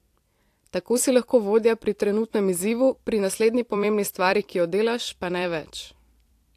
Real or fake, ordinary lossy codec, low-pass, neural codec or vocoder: fake; AAC, 64 kbps; 14.4 kHz; vocoder, 44.1 kHz, 128 mel bands every 256 samples, BigVGAN v2